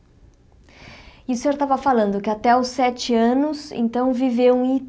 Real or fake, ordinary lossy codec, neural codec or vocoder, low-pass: real; none; none; none